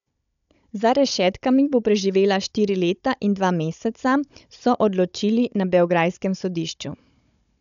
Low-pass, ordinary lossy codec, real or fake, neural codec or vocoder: 7.2 kHz; none; fake; codec, 16 kHz, 16 kbps, FunCodec, trained on Chinese and English, 50 frames a second